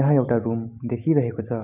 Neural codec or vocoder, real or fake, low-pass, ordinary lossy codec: none; real; 3.6 kHz; none